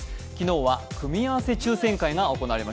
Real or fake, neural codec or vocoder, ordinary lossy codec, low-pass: real; none; none; none